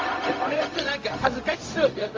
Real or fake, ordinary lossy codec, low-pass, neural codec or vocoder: fake; Opus, 32 kbps; 7.2 kHz; codec, 16 kHz, 0.4 kbps, LongCat-Audio-Codec